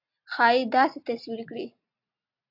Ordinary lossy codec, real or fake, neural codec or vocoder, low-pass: AAC, 48 kbps; real; none; 5.4 kHz